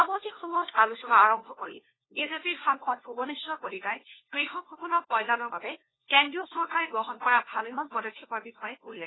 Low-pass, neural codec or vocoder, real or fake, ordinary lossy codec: 7.2 kHz; codec, 16 kHz, 1 kbps, FunCodec, trained on LibriTTS, 50 frames a second; fake; AAC, 16 kbps